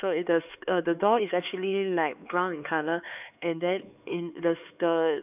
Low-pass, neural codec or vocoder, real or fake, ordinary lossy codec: 3.6 kHz; codec, 16 kHz, 4 kbps, X-Codec, HuBERT features, trained on LibriSpeech; fake; none